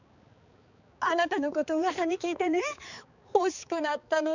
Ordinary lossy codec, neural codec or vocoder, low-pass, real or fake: none; codec, 16 kHz, 4 kbps, X-Codec, HuBERT features, trained on balanced general audio; 7.2 kHz; fake